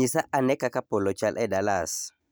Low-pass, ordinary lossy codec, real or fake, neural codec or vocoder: none; none; real; none